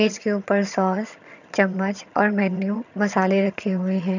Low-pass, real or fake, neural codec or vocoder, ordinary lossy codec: 7.2 kHz; fake; vocoder, 22.05 kHz, 80 mel bands, HiFi-GAN; none